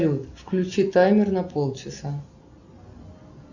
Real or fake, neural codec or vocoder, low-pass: real; none; 7.2 kHz